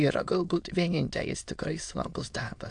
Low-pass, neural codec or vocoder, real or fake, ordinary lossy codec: 9.9 kHz; autoencoder, 22.05 kHz, a latent of 192 numbers a frame, VITS, trained on many speakers; fake; AAC, 64 kbps